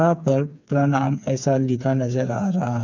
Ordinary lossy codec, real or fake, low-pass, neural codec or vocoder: none; fake; 7.2 kHz; codec, 16 kHz, 4 kbps, FreqCodec, smaller model